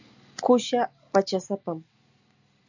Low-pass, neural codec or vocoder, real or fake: 7.2 kHz; none; real